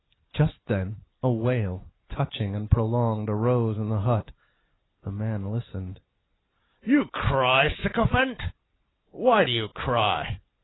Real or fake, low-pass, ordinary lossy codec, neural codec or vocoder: real; 7.2 kHz; AAC, 16 kbps; none